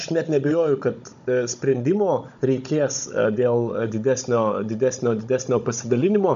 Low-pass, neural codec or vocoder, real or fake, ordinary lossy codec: 7.2 kHz; codec, 16 kHz, 16 kbps, FunCodec, trained on Chinese and English, 50 frames a second; fake; AAC, 96 kbps